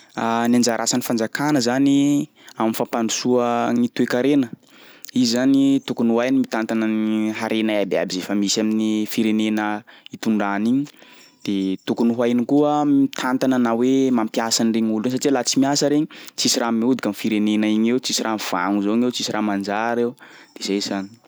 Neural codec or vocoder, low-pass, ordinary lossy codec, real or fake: none; none; none; real